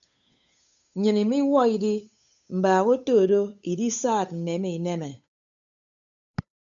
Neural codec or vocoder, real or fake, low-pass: codec, 16 kHz, 8 kbps, FunCodec, trained on Chinese and English, 25 frames a second; fake; 7.2 kHz